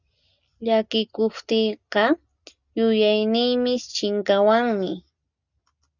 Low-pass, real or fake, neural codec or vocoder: 7.2 kHz; real; none